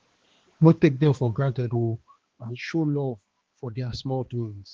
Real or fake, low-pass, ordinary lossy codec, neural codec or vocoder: fake; 7.2 kHz; Opus, 16 kbps; codec, 16 kHz, 2 kbps, X-Codec, HuBERT features, trained on balanced general audio